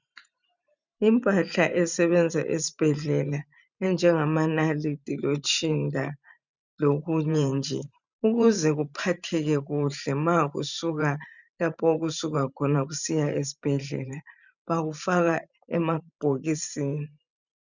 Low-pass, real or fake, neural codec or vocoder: 7.2 kHz; fake; vocoder, 44.1 kHz, 128 mel bands every 512 samples, BigVGAN v2